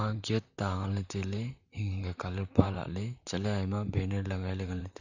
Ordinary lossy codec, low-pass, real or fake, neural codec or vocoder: none; 7.2 kHz; fake; vocoder, 44.1 kHz, 128 mel bands, Pupu-Vocoder